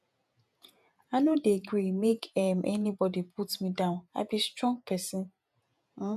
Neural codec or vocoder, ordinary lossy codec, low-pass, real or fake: vocoder, 48 kHz, 128 mel bands, Vocos; none; 14.4 kHz; fake